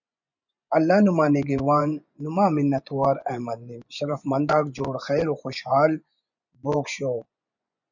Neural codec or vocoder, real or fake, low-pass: vocoder, 24 kHz, 100 mel bands, Vocos; fake; 7.2 kHz